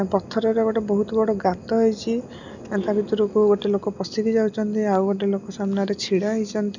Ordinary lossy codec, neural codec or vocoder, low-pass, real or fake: none; none; 7.2 kHz; real